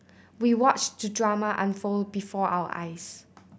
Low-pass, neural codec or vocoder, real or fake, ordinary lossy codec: none; none; real; none